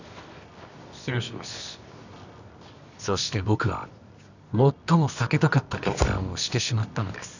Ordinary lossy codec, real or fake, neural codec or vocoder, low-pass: none; fake; codec, 24 kHz, 0.9 kbps, WavTokenizer, medium music audio release; 7.2 kHz